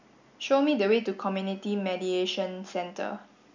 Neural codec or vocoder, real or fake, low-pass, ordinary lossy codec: none; real; 7.2 kHz; none